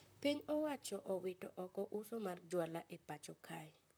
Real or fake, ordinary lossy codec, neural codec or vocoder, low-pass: fake; none; vocoder, 44.1 kHz, 128 mel bands, Pupu-Vocoder; none